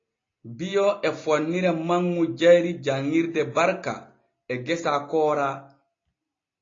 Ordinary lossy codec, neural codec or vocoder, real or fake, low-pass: AAC, 32 kbps; none; real; 7.2 kHz